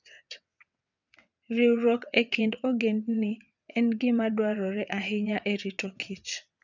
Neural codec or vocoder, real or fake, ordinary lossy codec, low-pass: vocoder, 22.05 kHz, 80 mel bands, WaveNeXt; fake; none; 7.2 kHz